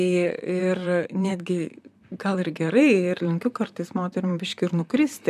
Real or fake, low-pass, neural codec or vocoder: fake; 14.4 kHz; vocoder, 44.1 kHz, 128 mel bands, Pupu-Vocoder